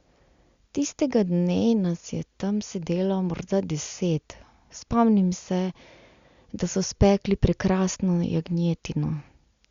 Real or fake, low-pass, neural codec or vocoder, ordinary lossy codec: real; 7.2 kHz; none; Opus, 64 kbps